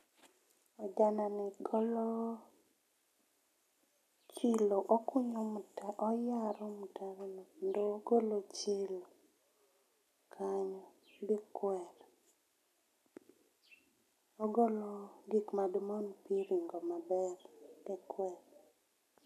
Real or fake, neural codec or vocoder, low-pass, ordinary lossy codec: real; none; 14.4 kHz; none